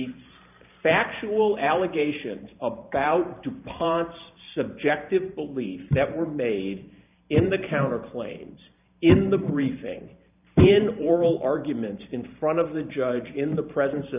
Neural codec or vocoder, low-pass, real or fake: vocoder, 44.1 kHz, 128 mel bands every 512 samples, BigVGAN v2; 3.6 kHz; fake